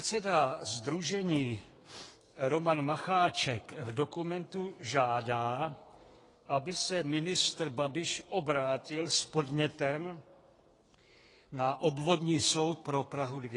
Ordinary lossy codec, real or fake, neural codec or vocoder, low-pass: AAC, 32 kbps; fake; codec, 32 kHz, 1.9 kbps, SNAC; 10.8 kHz